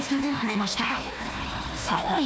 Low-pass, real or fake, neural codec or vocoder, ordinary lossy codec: none; fake; codec, 16 kHz, 1 kbps, FunCodec, trained on Chinese and English, 50 frames a second; none